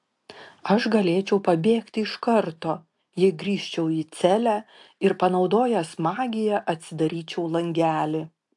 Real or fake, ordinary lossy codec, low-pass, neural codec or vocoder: real; AAC, 64 kbps; 10.8 kHz; none